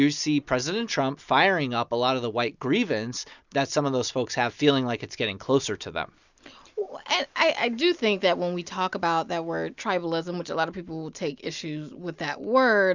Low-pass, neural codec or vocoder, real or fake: 7.2 kHz; none; real